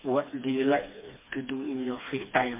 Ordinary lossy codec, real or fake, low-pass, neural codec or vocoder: MP3, 24 kbps; fake; 3.6 kHz; codec, 16 kHz, 2 kbps, FreqCodec, smaller model